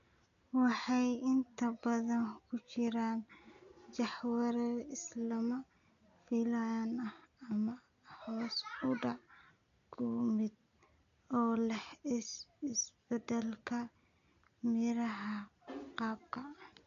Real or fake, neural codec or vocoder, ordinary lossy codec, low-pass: real; none; none; 7.2 kHz